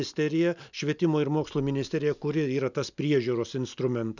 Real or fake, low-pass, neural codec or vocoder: real; 7.2 kHz; none